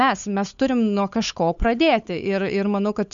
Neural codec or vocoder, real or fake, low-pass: codec, 16 kHz, 8 kbps, FunCodec, trained on Chinese and English, 25 frames a second; fake; 7.2 kHz